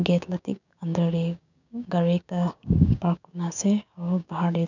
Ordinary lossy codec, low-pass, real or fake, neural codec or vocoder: none; 7.2 kHz; fake; codec, 16 kHz, 6 kbps, DAC